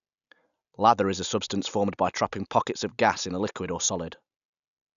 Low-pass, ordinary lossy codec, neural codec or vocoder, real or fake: 7.2 kHz; none; none; real